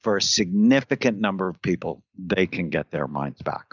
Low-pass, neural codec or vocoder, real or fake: 7.2 kHz; none; real